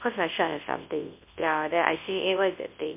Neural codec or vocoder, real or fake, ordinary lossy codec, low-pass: codec, 24 kHz, 0.9 kbps, WavTokenizer, large speech release; fake; MP3, 24 kbps; 3.6 kHz